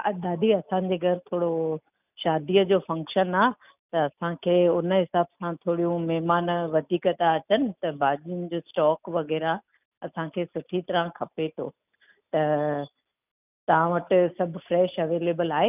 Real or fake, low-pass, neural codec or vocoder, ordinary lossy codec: real; 3.6 kHz; none; none